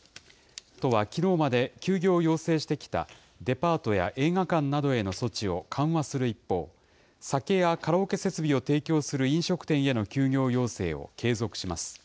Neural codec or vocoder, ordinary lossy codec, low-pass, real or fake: none; none; none; real